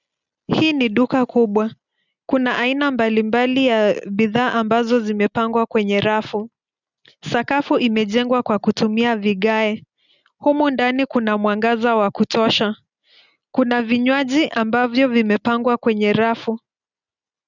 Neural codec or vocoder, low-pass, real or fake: none; 7.2 kHz; real